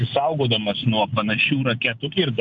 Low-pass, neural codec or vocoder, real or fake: 7.2 kHz; none; real